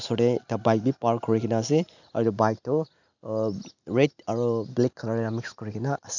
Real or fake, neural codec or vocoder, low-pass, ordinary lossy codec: real; none; 7.2 kHz; none